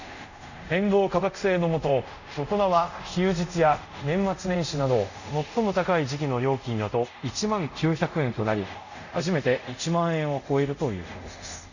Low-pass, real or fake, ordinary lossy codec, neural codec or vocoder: 7.2 kHz; fake; none; codec, 24 kHz, 0.5 kbps, DualCodec